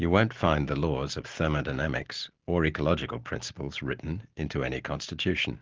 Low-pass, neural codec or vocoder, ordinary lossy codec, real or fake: 7.2 kHz; none; Opus, 16 kbps; real